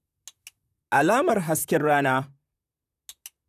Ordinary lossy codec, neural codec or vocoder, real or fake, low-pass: none; vocoder, 44.1 kHz, 128 mel bands, Pupu-Vocoder; fake; 14.4 kHz